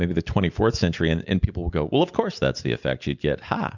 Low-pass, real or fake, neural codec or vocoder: 7.2 kHz; real; none